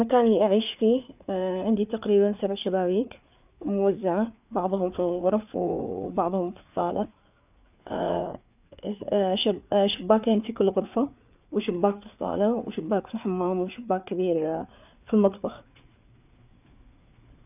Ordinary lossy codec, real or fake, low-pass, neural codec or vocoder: none; fake; 3.6 kHz; codec, 16 kHz, 4 kbps, FreqCodec, larger model